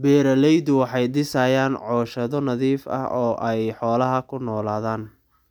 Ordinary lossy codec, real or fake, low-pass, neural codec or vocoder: none; real; 19.8 kHz; none